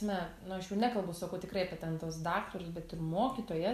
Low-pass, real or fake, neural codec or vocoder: 14.4 kHz; real; none